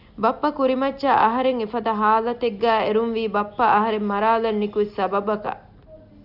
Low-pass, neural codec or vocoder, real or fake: 5.4 kHz; none; real